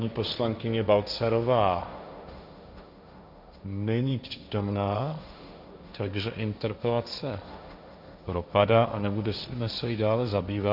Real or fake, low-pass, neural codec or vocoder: fake; 5.4 kHz; codec, 16 kHz, 1.1 kbps, Voila-Tokenizer